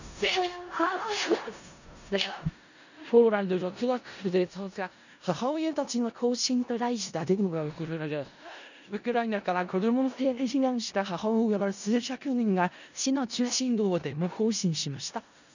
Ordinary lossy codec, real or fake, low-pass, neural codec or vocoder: none; fake; 7.2 kHz; codec, 16 kHz in and 24 kHz out, 0.4 kbps, LongCat-Audio-Codec, four codebook decoder